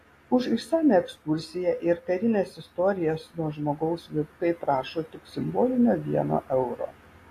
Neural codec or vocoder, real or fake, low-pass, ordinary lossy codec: none; real; 14.4 kHz; AAC, 48 kbps